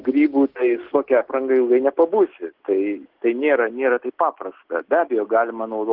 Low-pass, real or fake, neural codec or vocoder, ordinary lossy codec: 5.4 kHz; real; none; Opus, 16 kbps